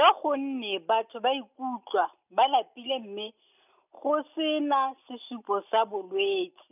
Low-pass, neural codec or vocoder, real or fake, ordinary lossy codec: 3.6 kHz; none; real; none